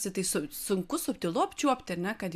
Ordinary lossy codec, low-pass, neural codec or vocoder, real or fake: MP3, 96 kbps; 14.4 kHz; none; real